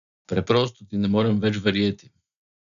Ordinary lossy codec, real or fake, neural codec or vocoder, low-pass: none; real; none; 7.2 kHz